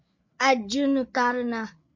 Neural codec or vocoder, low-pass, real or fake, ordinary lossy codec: codec, 16 kHz, 8 kbps, FreqCodec, larger model; 7.2 kHz; fake; MP3, 48 kbps